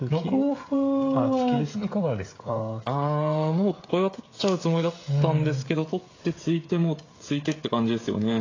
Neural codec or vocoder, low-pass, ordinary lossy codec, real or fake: codec, 16 kHz, 16 kbps, FreqCodec, smaller model; 7.2 kHz; AAC, 32 kbps; fake